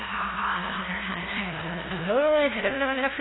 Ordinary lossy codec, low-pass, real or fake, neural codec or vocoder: AAC, 16 kbps; 7.2 kHz; fake; codec, 16 kHz, 0.5 kbps, FunCodec, trained on LibriTTS, 25 frames a second